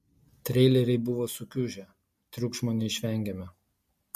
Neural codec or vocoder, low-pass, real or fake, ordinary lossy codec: none; 14.4 kHz; real; MP3, 64 kbps